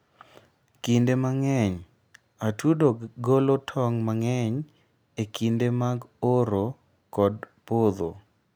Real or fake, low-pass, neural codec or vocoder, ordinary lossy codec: real; none; none; none